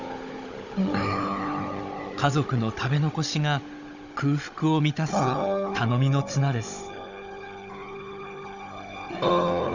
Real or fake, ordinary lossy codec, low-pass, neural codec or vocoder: fake; none; 7.2 kHz; codec, 16 kHz, 16 kbps, FunCodec, trained on Chinese and English, 50 frames a second